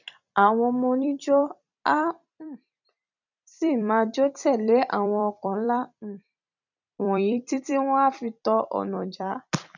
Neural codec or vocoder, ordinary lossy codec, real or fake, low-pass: vocoder, 44.1 kHz, 128 mel bands every 256 samples, BigVGAN v2; none; fake; 7.2 kHz